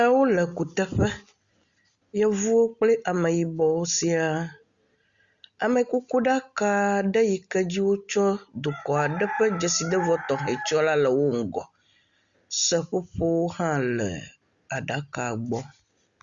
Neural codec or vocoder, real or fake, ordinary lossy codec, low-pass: none; real; Opus, 64 kbps; 7.2 kHz